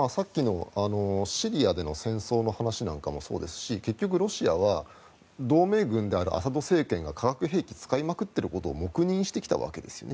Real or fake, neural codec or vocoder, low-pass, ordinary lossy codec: real; none; none; none